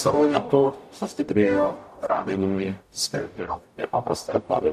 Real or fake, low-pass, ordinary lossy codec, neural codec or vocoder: fake; 14.4 kHz; AAC, 96 kbps; codec, 44.1 kHz, 0.9 kbps, DAC